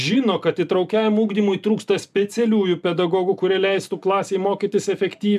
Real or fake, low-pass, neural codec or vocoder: real; 14.4 kHz; none